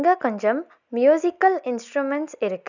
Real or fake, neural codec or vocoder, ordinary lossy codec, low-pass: real; none; none; 7.2 kHz